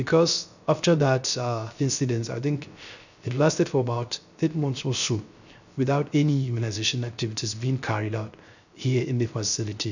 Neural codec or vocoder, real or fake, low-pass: codec, 16 kHz, 0.3 kbps, FocalCodec; fake; 7.2 kHz